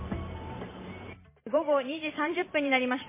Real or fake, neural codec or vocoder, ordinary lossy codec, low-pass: fake; vocoder, 44.1 kHz, 128 mel bands every 512 samples, BigVGAN v2; MP3, 16 kbps; 3.6 kHz